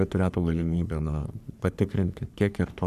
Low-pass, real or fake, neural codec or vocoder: 14.4 kHz; fake; codec, 44.1 kHz, 3.4 kbps, Pupu-Codec